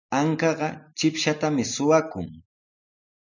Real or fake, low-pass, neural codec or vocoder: real; 7.2 kHz; none